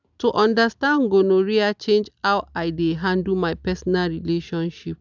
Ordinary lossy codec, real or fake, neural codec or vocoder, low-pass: none; real; none; 7.2 kHz